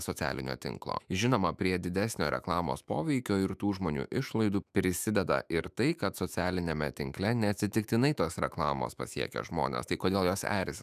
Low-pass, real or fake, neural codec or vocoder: 14.4 kHz; fake; autoencoder, 48 kHz, 128 numbers a frame, DAC-VAE, trained on Japanese speech